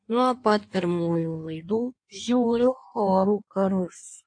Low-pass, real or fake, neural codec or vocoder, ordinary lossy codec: 9.9 kHz; fake; codec, 16 kHz in and 24 kHz out, 1.1 kbps, FireRedTTS-2 codec; AAC, 48 kbps